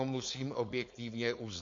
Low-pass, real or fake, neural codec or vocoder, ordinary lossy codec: 7.2 kHz; fake; codec, 16 kHz, 4.8 kbps, FACodec; MP3, 48 kbps